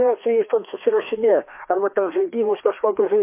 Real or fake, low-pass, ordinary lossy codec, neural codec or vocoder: fake; 3.6 kHz; MP3, 24 kbps; codec, 16 kHz, 2 kbps, FreqCodec, larger model